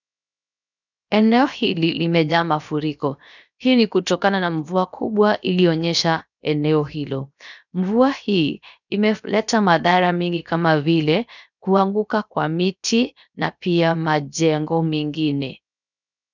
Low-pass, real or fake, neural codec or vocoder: 7.2 kHz; fake; codec, 16 kHz, 0.7 kbps, FocalCodec